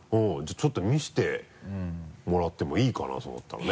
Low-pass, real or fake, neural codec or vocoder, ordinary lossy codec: none; real; none; none